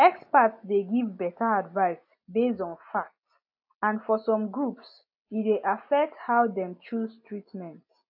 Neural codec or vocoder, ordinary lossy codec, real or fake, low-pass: none; AAC, 48 kbps; real; 5.4 kHz